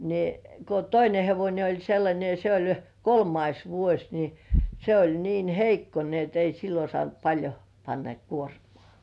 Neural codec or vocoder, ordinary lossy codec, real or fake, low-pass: none; none; real; none